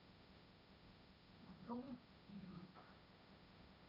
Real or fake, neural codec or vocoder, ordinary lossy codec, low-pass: fake; codec, 16 kHz, 1.1 kbps, Voila-Tokenizer; none; 5.4 kHz